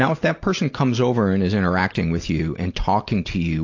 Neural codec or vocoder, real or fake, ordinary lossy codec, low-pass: none; real; AAC, 48 kbps; 7.2 kHz